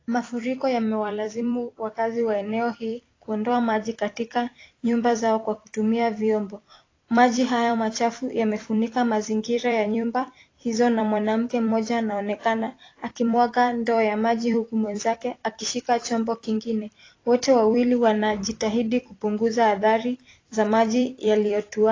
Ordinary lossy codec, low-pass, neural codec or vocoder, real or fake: AAC, 32 kbps; 7.2 kHz; vocoder, 44.1 kHz, 128 mel bands every 256 samples, BigVGAN v2; fake